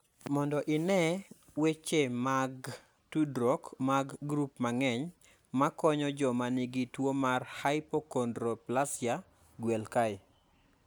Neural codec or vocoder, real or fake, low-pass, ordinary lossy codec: none; real; none; none